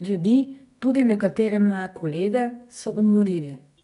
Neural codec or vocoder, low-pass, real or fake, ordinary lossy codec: codec, 24 kHz, 0.9 kbps, WavTokenizer, medium music audio release; 10.8 kHz; fake; none